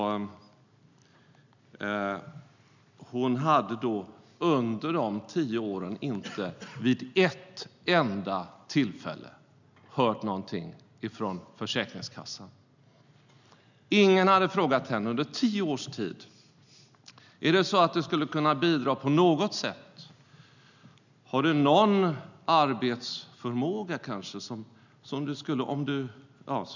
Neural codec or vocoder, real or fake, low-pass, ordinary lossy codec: none; real; 7.2 kHz; none